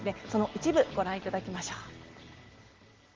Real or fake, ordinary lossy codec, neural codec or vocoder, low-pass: real; Opus, 16 kbps; none; 7.2 kHz